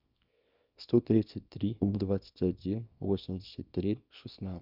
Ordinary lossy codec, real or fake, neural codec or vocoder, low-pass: Opus, 64 kbps; fake; codec, 24 kHz, 0.9 kbps, WavTokenizer, small release; 5.4 kHz